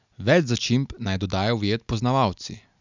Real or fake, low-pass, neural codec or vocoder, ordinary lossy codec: real; 7.2 kHz; none; none